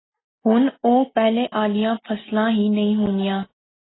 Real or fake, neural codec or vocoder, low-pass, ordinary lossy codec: real; none; 7.2 kHz; AAC, 16 kbps